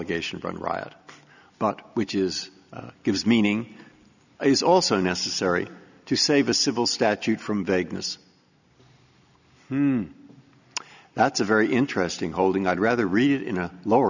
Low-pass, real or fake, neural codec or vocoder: 7.2 kHz; real; none